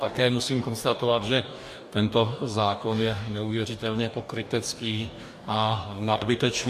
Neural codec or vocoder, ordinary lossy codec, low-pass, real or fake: codec, 44.1 kHz, 2.6 kbps, DAC; MP3, 64 kbps; 14.4 kHz; fake